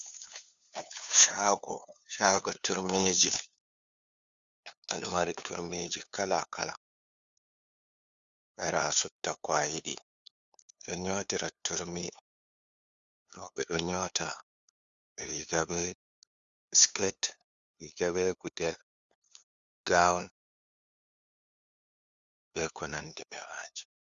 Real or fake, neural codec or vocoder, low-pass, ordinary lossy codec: fake; codec, 16 kHz, 2 kbps, FunCodec, trained on LibriTTS, 25 frames a second; 7.2 kHz; Opus, 64 kbps